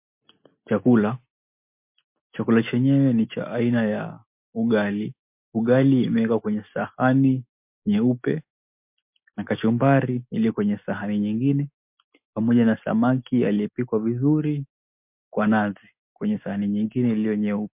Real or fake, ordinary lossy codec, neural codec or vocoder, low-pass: real; MP3, 32 kbps; none; 3.6 kHz